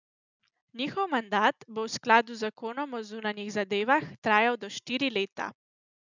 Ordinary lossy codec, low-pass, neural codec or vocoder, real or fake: none; 7.2 kHz; none; real